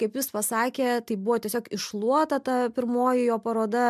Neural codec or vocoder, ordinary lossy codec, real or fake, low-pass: none; MP3, 96 kbps; real; 14.4 kHz